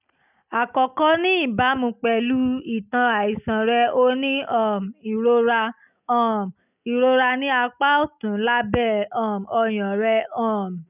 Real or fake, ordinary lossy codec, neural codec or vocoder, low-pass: real; none; none; 3.6 kHz